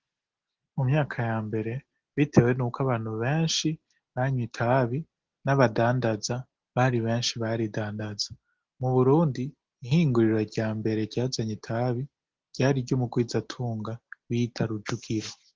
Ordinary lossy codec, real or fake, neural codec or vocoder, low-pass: Opus, 16 kbps; real; none; 7.2 kHz